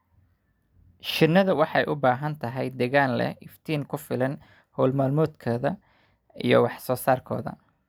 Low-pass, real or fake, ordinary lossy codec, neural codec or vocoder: none; real; none; none